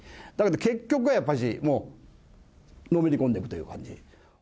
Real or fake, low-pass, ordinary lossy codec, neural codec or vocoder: real; none; none; none